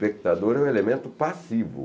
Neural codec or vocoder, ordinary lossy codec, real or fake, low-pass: none; none; real; none